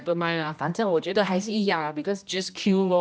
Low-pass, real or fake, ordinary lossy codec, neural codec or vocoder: none; fake; none; codec, 16 kHz, 1 kbps, X-Codec, HuBERT features, trained on general audio